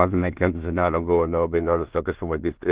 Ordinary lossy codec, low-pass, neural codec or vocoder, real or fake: Opus, 16 kbps; 3.6 kHz; codec, 16 kHz in and 24 kHz out, 0.4 kbps, LongCat-Audio-Codec, two codebook decoder; fake